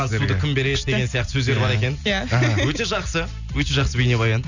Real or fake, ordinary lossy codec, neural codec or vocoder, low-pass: real; none; none; 7.2 kHz